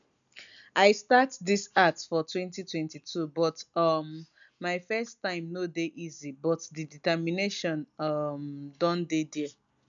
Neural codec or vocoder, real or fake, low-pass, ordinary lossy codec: none; real; 7.2 kHz; none